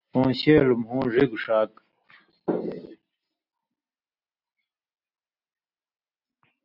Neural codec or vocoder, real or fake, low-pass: none; real; 5.4 kHz